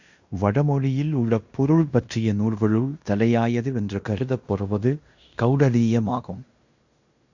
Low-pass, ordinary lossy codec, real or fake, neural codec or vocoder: 7.2 kHz; Opus, 64 kbps; fake; codec, 16 kHz in and 24 kHz out, 0.9 kbps, LongCat-Audio-Codec, fine tuned four codebook decoder